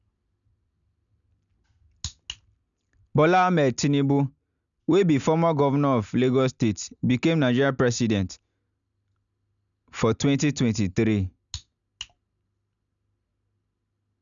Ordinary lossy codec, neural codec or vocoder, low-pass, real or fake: none; none; 7.2 kHz; real